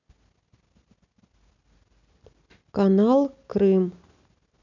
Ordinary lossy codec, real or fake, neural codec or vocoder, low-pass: none; real; none; 7.2 kHz